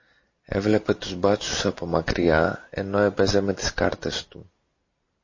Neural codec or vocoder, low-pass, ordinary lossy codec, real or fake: none; 7.2 kHz; MP3, 32 kbps; real